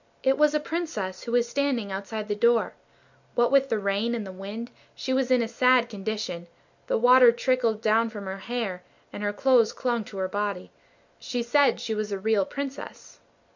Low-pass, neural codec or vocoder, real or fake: 7.2 kHz; none; real